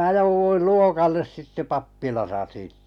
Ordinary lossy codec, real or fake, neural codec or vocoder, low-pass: none; real; none; 19.8 kHz